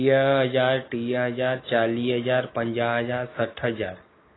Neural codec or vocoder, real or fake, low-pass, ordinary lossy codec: none; real; 7.2 kHz; AAC, 16 kbps